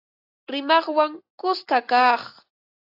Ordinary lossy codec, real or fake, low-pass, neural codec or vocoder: AAC, 32 kbps; real; 5.4 kHz; none